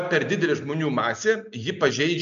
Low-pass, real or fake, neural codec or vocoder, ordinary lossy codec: 7.2 kHz; real; none; AAC, 64 kbps